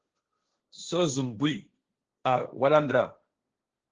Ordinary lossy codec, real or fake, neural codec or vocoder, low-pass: Opus, 16 kbps; fake; codec, 16 kHz, 1.1 kbps, Voila-Tokenizer; 7.2 kHz